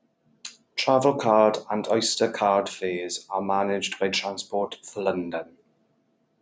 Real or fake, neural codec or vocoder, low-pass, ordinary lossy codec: real; none; none; none